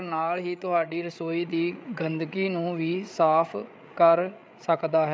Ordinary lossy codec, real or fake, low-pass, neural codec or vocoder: none; fake; none; codec, 16 kHz, 8 kbps, FreqCodec, larger model